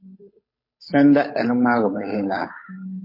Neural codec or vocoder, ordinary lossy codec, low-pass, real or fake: codec, 16 kHz, 8 kbps, FunCodec, trained on Chinese and English, 25 frames a second; MP3, 24 kbps; 5.4 kHz; fake